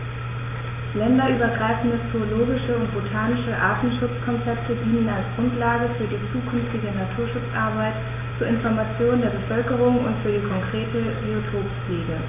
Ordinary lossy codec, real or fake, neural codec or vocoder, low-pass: none; real; none; 3.6 kHz